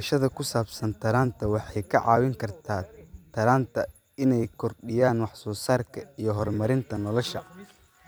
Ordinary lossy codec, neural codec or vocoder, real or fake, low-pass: none; vocoder, 44.1 kHz, 128 mel bands every 256 samples, BigVGAN v2; fake; none